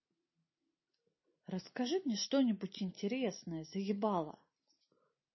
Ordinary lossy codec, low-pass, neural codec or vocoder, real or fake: MP3, 24 kbps; 7.2 kHz; none; real